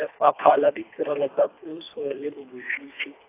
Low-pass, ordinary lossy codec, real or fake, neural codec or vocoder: 3.6 kHz; AAC, 24 kbps; fake; codec, 24 kHz, 1.5 kbps, HILCodec